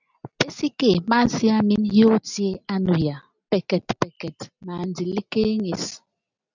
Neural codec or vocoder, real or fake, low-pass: none; real; 7.2 kHz